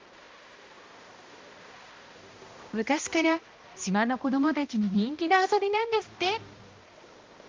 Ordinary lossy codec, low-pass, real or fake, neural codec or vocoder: Opus, 32 kbps; 7.2 kHz; fake; codec, 16 kHz, 1 kbps, X-Codec, HuBERT features, trained on balanced general audio